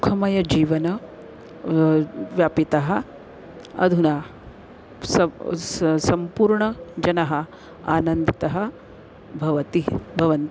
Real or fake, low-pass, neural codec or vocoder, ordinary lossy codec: real; none; none; none